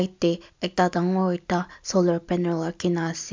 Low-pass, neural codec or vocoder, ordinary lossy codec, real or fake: 7.2 kHz; none; MP3, 64 kbps; real